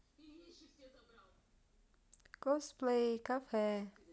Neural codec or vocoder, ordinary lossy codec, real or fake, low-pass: none; none; real; none